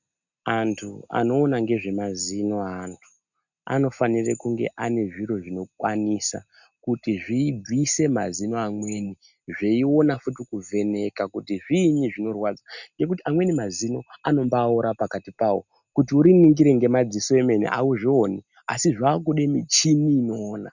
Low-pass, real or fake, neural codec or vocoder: 7.2 kHz; real; none